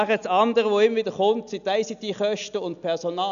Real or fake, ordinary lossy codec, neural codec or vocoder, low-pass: real; none; none; 7.2 kHz